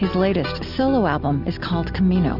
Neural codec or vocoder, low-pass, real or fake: none; 5.4 kHz; real